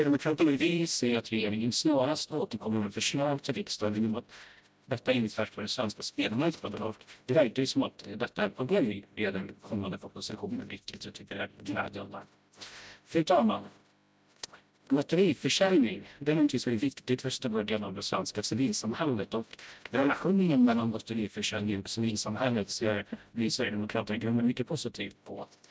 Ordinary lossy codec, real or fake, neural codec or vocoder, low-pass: none; fake; codec, 16 kHz, 0.5 kbps, FreqCodec, smaller model; none